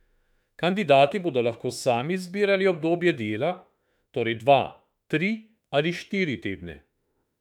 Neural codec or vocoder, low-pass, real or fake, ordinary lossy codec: autoencoder, 48 kHz, 32 numbers a frame, DAC-VAE, trained on Japanese speech; 19.8 kHz; fake; none